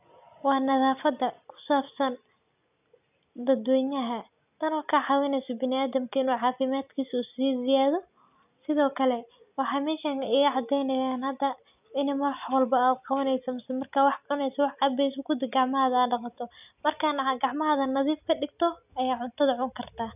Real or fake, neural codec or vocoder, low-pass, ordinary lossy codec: real; none; 3.6 kHz; none